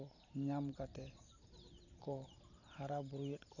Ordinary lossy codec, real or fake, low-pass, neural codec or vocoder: none; fake; 7.2 kHz; vocoder, 44.1 kHz, 128 mel bands every 512 samples, BigVGAN v2